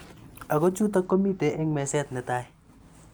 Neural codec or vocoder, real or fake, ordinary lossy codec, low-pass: none; real; none; none